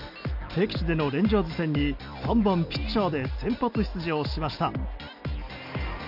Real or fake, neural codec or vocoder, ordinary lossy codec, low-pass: real; none; none; 5.4 kHz